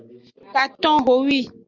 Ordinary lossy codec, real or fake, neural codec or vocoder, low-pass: Opus, 64 kbps; real; none; 7.2 kHz